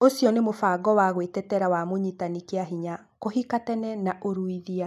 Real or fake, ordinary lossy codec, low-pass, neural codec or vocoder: real; none; 14.4 kHz; none